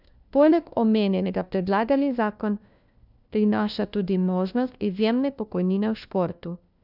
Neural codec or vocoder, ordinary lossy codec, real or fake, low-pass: codec, 16 kHz, 1 kbps, FunCodec, trained on LibriTTS, 50 frames a second; none; fake; 5.4 kHz